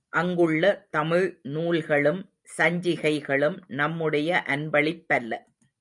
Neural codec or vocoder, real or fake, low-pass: vocoder, 44.1 kHz, 128 mel bands every 256 samples, BigVGAN v2; fake; 10.8 kHz